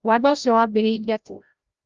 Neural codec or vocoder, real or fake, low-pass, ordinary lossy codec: codec, 16 kHz, 0.5 kbps, FreqCodec, larger model; fake; 7.2 kHz; Opus, 32 kbps